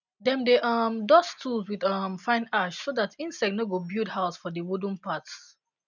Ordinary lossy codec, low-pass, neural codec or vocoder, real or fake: none; 7.2 kHz; none; real